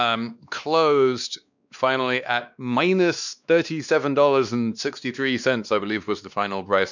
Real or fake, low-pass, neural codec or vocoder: fake; 7.2 kHz; codec, 16 kHz, 2 kbps, X-Codec, WavLM features, trained on Multilingual LibriSpeech